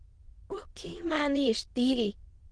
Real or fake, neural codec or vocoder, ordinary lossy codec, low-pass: fake; autoencoder, 22.05 kHz, a latent of 192 numbers a frame, VITS, trained on many speakers; Opus, 16 kbps; 9.9 kHz